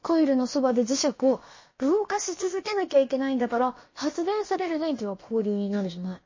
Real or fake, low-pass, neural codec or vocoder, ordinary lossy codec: fake; 7.2 kHz; codec, 16 kHz, about 1 kbps, DyCAST, with the encoder's durations; MP3, 32 kbps